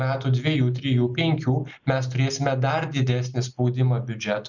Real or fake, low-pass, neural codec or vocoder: real; 7.2 kHz; none